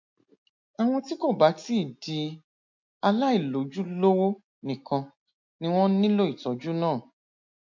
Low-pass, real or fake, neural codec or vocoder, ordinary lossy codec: 7.2 kHz; real; none; MP3, 48 kbps